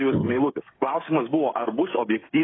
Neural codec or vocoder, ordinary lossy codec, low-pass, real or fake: codec, 16 kHz, 16 kbps, FreqCodec, smaller model; AAC, 16 kbps; 7.2 kHz; fake